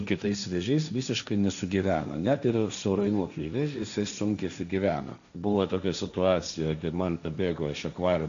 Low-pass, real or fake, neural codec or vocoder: 7.2 kHz; fake; codec, 16 kHz, 1.1 kbps, Voila-Tokenizer